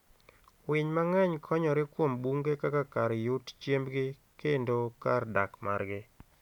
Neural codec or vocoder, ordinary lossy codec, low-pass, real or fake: none; none; 19.8 kHz; real